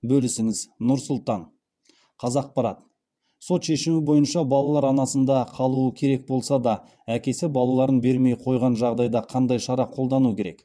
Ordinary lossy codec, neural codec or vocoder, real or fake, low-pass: none; vocoder, 22.05 kHz, 80 mel bands, WaveNeXt; fake; none